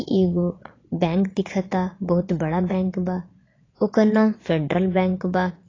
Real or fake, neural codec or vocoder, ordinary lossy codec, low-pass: fake; vocoder, 22.05 kHz, 80 mel bands, Vocos; AAC, 32 kbps; 7.2 kHz